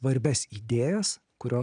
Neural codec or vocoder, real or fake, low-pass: none; real; 9.9 kHz